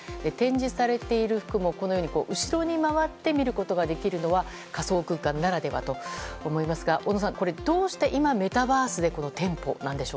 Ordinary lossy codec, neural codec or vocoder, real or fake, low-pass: none; none; real; none